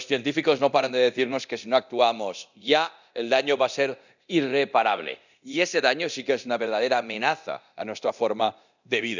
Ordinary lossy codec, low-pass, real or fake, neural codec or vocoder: none; 7.2 kHz; fake; codec, 24 kHz, 0.9 kbps, DualCodec